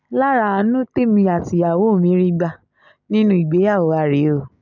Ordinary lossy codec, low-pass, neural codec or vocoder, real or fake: none; 7.2 kHz; none; real